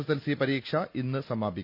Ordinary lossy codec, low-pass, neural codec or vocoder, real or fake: none; 5.4 kHz; none; real